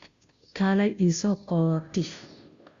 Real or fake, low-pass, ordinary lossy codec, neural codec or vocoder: fake; 7.2 kHz; Opus, 64 kbps; codec, 16 kHz, 0.5 kbps, FunCodec, trained on Chinese and English, 25 frames a second